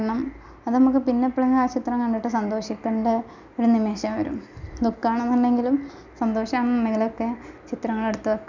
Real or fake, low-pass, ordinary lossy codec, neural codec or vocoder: real; 7.2 kHz; none; none